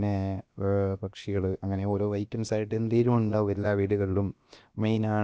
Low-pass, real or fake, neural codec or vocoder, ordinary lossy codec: none; fake; codec, 16 kHz, about 1 kbps, DyCAST, with the encoder's durations; none